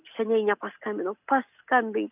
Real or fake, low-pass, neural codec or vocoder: real; 3.6 kHz; none